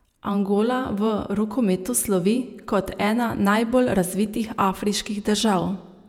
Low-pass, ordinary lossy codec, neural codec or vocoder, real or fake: 19.8 kHz; none; vocoder, 48 kHz, 128 mel bands, Vocos; fake